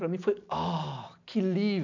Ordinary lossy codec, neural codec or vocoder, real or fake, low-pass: none; none; real; 7.2 kHz